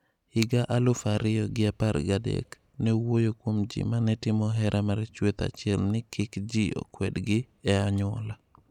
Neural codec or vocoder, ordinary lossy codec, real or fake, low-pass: none; none; real; 19.8 kHz